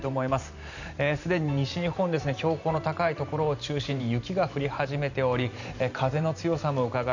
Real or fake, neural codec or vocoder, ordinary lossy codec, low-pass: fake; vocoder, 44.1 kHz, 128 mel bands every 512 samples, BigVGAN v2; none; 7.2 kHz